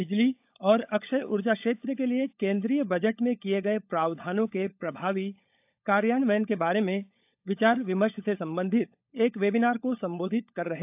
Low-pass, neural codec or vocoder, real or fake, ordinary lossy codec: 3.6 kHz; codec, 16 kHz, 16 kbps, FunCodec, trained on LibriTTS, 50 frames a second; fake; AAC, 32 kbps